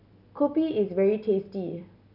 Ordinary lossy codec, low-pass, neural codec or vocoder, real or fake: none; 5.4 kHz; none; real